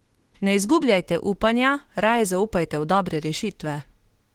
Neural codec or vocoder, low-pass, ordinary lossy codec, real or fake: autoencoder, 48 kHz, 32 numbers a frame, DAC-VAE, trained on Japanese speech; 19.8 kHz; Opus, 16 kbps; fake